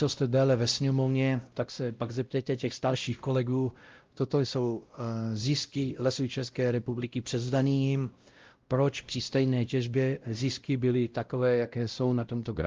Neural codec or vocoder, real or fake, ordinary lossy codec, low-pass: codec, 16 kHz, 0.5 kbps, X-Codec, WavLM features, trained on Multilingual LibriSpeech; fake; Opus, 32 kbps; 7.2 kHz